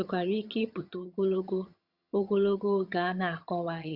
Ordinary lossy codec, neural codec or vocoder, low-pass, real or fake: Opus, 64 kbps; vocoder, 22.05 kHz, 80 mel bands, HiFi-GAN; 5.4 kHz; fake